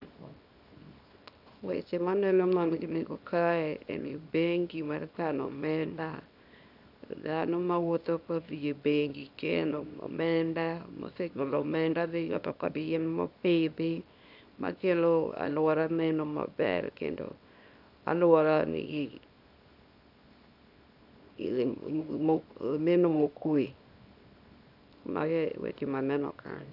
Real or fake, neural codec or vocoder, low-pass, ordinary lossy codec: fake; codec, 24 kHz, 0.9 kbps, WavTokenizer, small release; 5.4 kHz; none